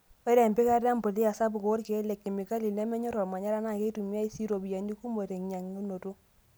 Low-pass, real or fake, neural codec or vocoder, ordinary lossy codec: none; real; none; none